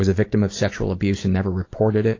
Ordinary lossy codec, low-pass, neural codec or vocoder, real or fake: AAC, 32 kbps; 7.2 kHz; none; real